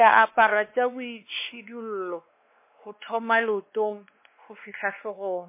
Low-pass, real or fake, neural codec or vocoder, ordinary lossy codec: 3.6 kHz; fake; codec, 16 kHz, 2 kbps, X-Codec, WavLM features, trained on Multilingual LibriSpeech; MP3, 24 kbps